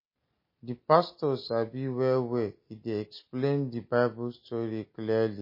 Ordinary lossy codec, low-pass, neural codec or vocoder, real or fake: MP3, 24 kbps; 5.4 kHz; none; real